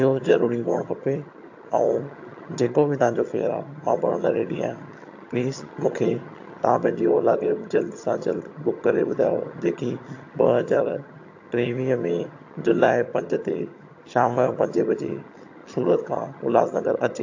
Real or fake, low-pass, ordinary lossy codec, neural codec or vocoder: fake; 7.2 kHz; AAC, 48 kbps; vocoder, 22.05 kHz, 80 mel bands, HiFi-GAN